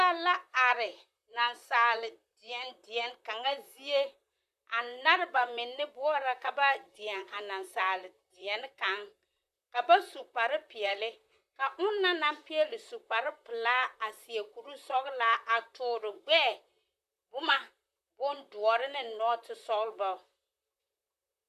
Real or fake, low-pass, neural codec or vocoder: fake; 14.4 kHz; vocoder, 44.1 kHz, 128 mel bands, Pupu-Vocoder